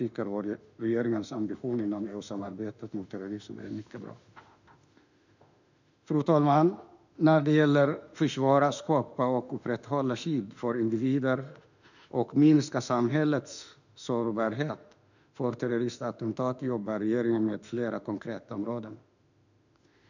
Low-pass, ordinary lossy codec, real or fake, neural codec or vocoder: 7.2 kHz; none; fake; autoencoder, 48 kHz, 32 numbers a frame, DAC-VAE, trained on Japanese speech